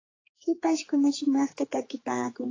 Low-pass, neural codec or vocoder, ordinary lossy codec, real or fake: 7.2 kHz; codec, 16 kHz, 4 kbps, X-Codec, HuBERT features, trained on general audio; AAC, 32 kbps; fake